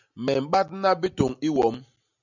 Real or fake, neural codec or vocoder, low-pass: real; none; 7.2 kHz